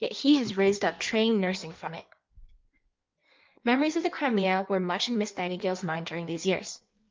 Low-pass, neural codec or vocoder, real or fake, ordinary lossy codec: 7.2 kHz; codec, 16 kHz in and 24 kHz out, 1.1 kbps, FireRedTTS-2 codec; fake; Opus, 24 kbps